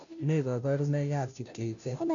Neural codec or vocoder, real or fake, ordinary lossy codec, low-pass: codec, 16 kHz, 0.5 kbps, FunCodec, trained on Chinese and English, 25 frames a second; fake; none; 7.2 kHz